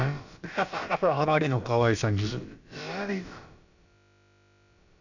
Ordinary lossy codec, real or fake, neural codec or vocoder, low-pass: none; fake; codec, 16 kHz, about 1 kbps, DyCAST, with the encoder's durations; 7.2 kHz